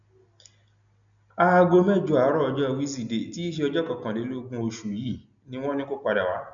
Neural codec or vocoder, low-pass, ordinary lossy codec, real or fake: none; 7.2 kHz; none; real